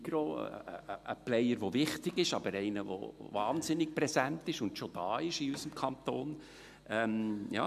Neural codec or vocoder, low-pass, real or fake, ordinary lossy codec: none; 14.4 kHz; real; MP3, 96 kbps